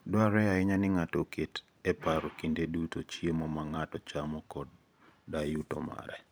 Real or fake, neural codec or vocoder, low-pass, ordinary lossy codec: fake; vocoder, 44.1 kHz, 128 mel bands every 512 samples, BigVGAN v2; none; none